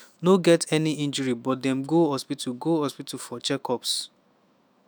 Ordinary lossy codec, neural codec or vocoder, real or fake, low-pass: none; autoencoder, 48 kHz, 128 numbers a frame, DAC-VAE, trained on Japanese speech; fake; none